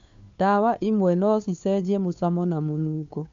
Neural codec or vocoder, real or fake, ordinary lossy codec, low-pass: codec, 16 kHz, 2 kbps, FunCodec, trained on Chinese and English, 25 frames a second; fake; MP3, 64 kbps; 7.2 kHz